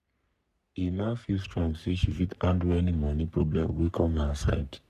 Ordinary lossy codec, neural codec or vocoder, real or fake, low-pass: none; codec, 44.1 kHz, 3.4 kbps, Pupu-Codec; fake; 14.4 kHz